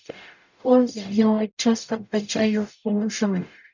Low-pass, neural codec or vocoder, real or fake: 7.2 kHz; codec, 44.1 kHz, 0.9 kbps, DAC; fake